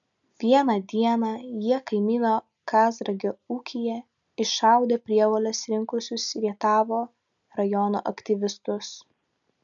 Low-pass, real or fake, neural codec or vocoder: 7.2 kHz; real; none